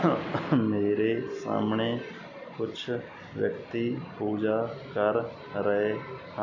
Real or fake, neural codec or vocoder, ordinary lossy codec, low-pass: real; none; none; 7.2 kHz